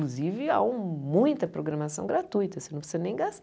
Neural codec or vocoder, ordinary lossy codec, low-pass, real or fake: none; none; none; real